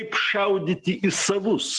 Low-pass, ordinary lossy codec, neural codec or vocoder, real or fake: 10.8 kHz; Opus, 24 kbps; none; real